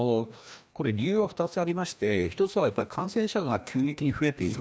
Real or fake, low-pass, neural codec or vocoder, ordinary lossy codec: fake; none; codec, 16 kHz, 1 kbps, FreqCodec, larger model; none